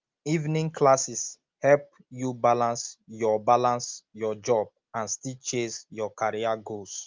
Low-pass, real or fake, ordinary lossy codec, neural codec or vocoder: 7.2 kHz; real; Opus, 24 kbps; none